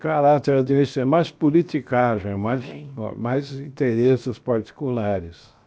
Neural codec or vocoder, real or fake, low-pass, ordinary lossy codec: codec, 16 kHz, 0.7 kbps, FocalCodec; fake; none; none